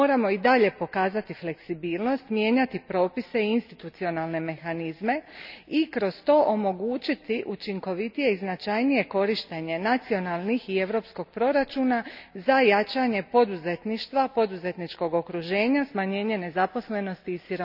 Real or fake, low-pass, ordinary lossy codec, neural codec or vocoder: real; 5.4 kHz; none; none